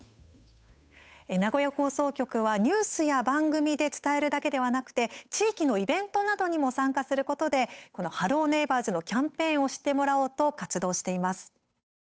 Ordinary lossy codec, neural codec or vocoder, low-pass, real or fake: none; codec, 16 kHz, 8 kbps, FunCodec, trained on Chinese and English, 25 frames a second; none; fake